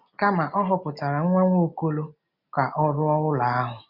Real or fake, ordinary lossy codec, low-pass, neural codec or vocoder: real; none; 5.4 kHz; none